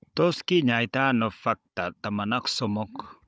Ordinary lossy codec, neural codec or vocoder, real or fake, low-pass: none; codec, 16 kHz, 16 kbps, FunCodec, trained on Chinese and English, 50 frames a second; fake; none